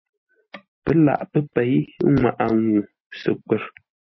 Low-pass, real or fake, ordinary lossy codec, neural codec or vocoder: 7.2 kHz; real; MP3, 24 kbps; none